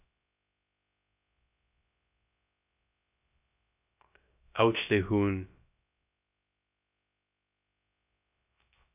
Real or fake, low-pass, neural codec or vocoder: fake; 3.6 kHz; codec, 16 kHz, 0.3 kbps, FocalCodec